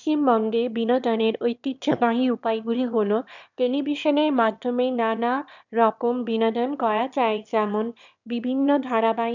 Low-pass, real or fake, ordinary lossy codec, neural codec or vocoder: 7.2 kHz; fake; none; autoencoder, 22.05 kHz, a latent of 192 numbers a frame, VITS, trained on one speaker